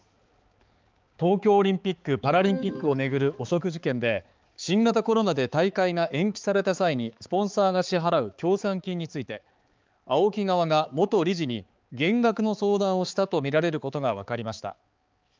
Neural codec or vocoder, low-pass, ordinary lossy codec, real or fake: codec, 16 kHz, 4 kbps, X-Codec, HuBERT features, trained on balanced general audio; 7.2 kHz; Opus, 24 kbps; fake